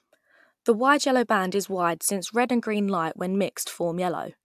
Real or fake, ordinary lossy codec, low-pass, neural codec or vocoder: real; AAC, 96 kbps; 14.4 kHz; none